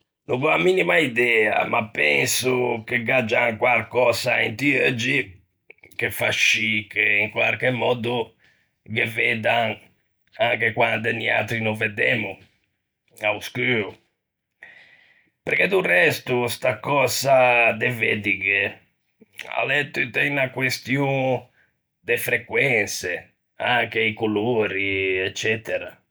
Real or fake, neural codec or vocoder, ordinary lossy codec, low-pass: real; none; none; none